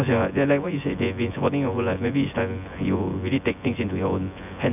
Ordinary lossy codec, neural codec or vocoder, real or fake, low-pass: none; vocoder, 24 kHz, 100 mel bands, Vocos; fake; 3.6 kHz